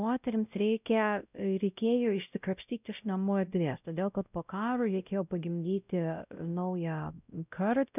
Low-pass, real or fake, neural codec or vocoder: 3.6 kHz; fake; codec, 16 kHz, 0.5 kbps, X-Codec, WavLM features, trained on Multilingual LibriSpeech